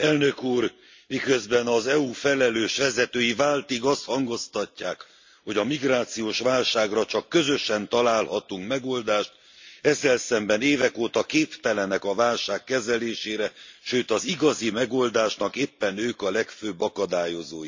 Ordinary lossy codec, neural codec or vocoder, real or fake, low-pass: MP3, 48 kbps; none; real; 7.2 kHz